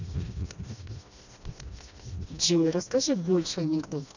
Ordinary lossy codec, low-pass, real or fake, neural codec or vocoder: none; 7.2 kHz; fake; codec, 16 kHz, 1 kbps, FreqCodec, smaller model